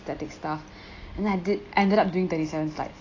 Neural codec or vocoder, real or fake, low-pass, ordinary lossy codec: none; real; 7.2 kHz; AAC, 32 kbps